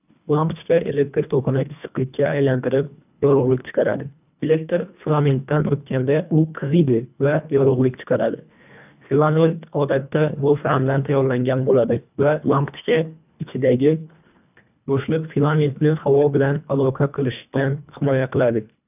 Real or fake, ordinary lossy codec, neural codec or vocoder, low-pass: fake; none; codec, 24 kHz, 1.5 kbps, HILCodec; 3.6 kHz